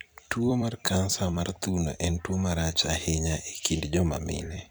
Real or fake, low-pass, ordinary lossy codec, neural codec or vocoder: fake; none; none; vocoder, 44.1 kHz, 128 mel bands every 256 samples, BigVGAN v2